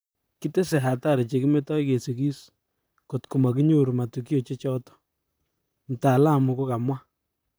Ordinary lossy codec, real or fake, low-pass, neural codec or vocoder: none; fake; none; vocoder, 44.1 kHz, 128 mel bands, Pupu-Vocoder